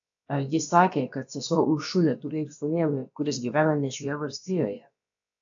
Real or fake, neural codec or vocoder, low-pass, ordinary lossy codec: fake; codec, 16 kHz, about 1 kbps, DyCAST, with the encoder's durations; 7.2 kHz; AAC, 64 kbps